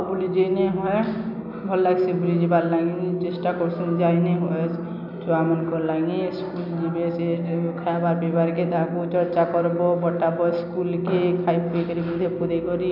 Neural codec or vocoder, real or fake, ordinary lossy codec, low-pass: none; real; none; 5.4 kHz